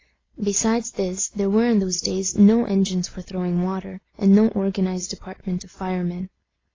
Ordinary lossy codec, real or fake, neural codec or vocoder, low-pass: AAC, 32 kbps; real; none; 7.2 kHz